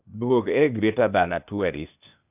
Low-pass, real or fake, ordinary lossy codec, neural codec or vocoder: 3.6 kHz; fake; none; codec, 16 kHz, 0.8 kbps, ZipCodec